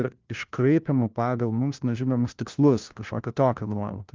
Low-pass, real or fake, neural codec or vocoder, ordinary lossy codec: 7.2 kHz; fake; codec, 16 kHz, 1 kbps, FunCodec, trained on LibriTTS, 50 frames a second; Opus, 24 kbps